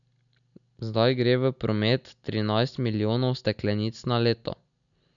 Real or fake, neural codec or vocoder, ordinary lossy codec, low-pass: real; none; none; 7.2 kHz